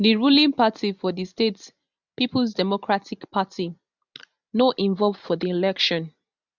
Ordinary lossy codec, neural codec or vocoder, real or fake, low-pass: none; none; real; 7.2 kHz